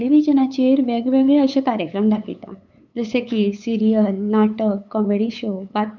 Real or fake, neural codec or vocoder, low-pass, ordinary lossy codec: fake; codec, 16 kHz, 8 kbps, FunCodec, trained on LibriTTS, 25 frames a second; 7.2 kHz; none